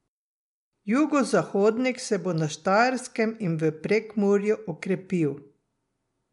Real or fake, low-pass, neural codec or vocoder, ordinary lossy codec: real; 10.8 kHz; none; MP3, 64 kbps